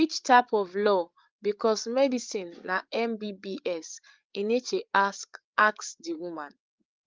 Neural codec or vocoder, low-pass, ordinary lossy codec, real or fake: codec, 16 kHz, 8 kbps, FreqCodec, larger model; 7.2 kHz; Opus, 24 kbps; fake